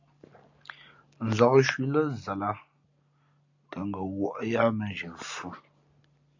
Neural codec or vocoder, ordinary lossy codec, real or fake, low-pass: none; AAC, 48 kbps; real; 7.2 kHz